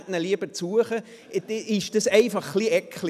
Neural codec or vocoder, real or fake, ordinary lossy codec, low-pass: none; real; none; 14.4 kHz